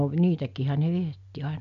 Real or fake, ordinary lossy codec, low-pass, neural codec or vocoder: real; none; 7.2 kHz; none